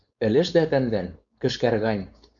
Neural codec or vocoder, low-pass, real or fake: codec, 16 kHz, 4.8 kbps, FACodec; 7.2 kHz; fake